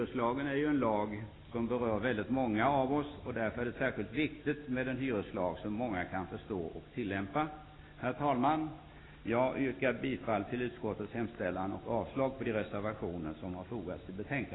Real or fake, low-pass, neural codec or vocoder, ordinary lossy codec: real; 7.2 kHz; none; AAC, 16 kbps